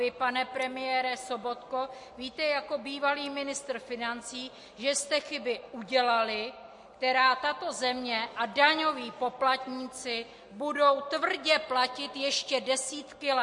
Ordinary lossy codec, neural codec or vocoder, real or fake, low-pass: MP3, 48 kbps; none; real; 10.8 kHz